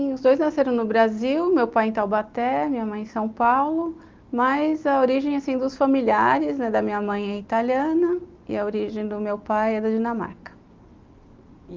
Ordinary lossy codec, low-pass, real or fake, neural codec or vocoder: Opus, 32 kbps; 7.2 kHz; real; none